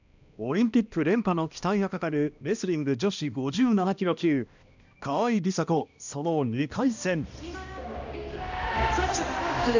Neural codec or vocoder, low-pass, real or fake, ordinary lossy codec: codec, 16 kHz, 1 kbps, X-Codec, HuBERT features, trained on balanced general audio; 7.2 kHz; fake; none